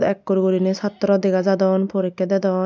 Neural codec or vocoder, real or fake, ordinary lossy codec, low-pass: none; real; none; none